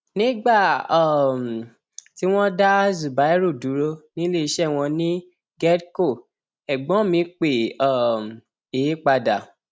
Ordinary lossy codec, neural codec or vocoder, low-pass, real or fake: none; none; none; real